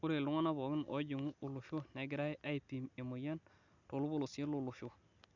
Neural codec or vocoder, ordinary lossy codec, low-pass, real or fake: none; none; 7.2 kHz; real